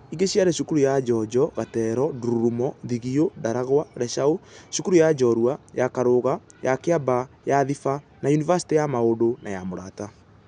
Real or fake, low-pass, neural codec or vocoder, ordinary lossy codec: real; 9.9 kHz; none; none